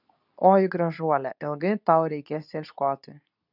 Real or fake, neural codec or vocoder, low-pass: fake; codec, 24 kHz, 0.9 kbps, WavTokenizer, medium speech release version 2; 5.4 kHz